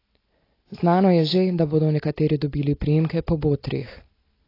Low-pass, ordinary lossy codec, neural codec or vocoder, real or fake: 5.4 kHz; AAC, 24 kbps; none; real